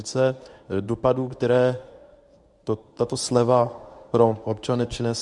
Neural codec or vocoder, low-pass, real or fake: codec, 24 kHz, 0.9 kbps, WavTokenizer, medium speech release version 1; 10.8 kHz; fake